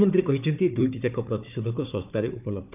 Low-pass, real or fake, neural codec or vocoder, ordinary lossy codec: 3.6 kHz; fake; codec, 16 kHz, 4 kbps, FunCodec, trained on Chinese and English, 50 frames a second; none